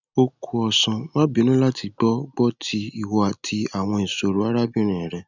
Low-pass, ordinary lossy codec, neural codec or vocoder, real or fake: 7.2 kHz; none; none; real